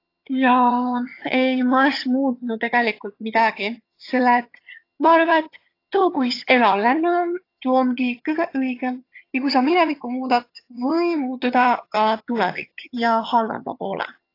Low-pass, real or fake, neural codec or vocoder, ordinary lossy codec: 5.4 kHz; fake; vocoder, 22.05 kHz, 80 mel bands, HiFi-GAN; AAC, 32 kbps